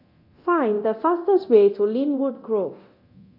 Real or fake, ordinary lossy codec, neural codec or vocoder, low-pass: fake; AAC, 32 kbps; codec, 24 kHz, 0.9 kbps, DualCodec; 5.4 kHz